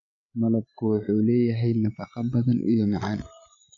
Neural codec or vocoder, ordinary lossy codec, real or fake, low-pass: codec, 16 kHz, 16 kbps, FreqCodec, larger model; AAC, 48 kbps; fake; 7.2 kHz